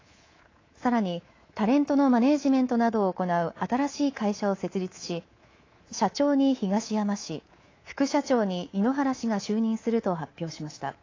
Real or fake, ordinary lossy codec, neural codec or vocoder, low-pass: fake; AAC, 32 kbps; codec, 24 kHz, 3.1 kbps, DualCodec; 7.2 kHz